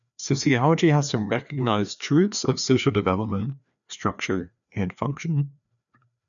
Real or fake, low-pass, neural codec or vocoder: fake; 7.2 kHz; codec, 16 kHz, 2 kbps, FreqCodec, larger model